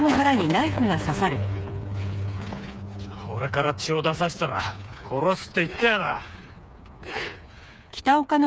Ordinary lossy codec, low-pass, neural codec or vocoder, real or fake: none; none; codec, 16 kHz, 4 kbps, FreqCodec, smaller model; fake